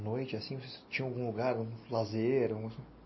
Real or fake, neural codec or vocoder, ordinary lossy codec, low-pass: real; none; MP3, 24 kbps; 7.2 kHz